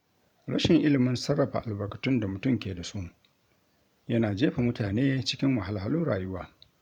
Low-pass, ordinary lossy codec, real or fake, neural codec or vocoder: 19.8 kHz; none; fake; vocoder, 44.1 kHz, 128 mel bands every 512 samples, BigVGAN v2